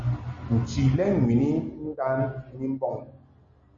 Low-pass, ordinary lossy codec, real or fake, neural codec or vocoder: 7.2 kHz; MP3, 32 kbps; real; none